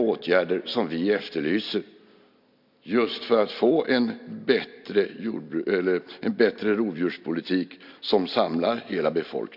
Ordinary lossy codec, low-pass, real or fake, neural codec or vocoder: MP3, 48 kbps; 5.4 kHz; real; none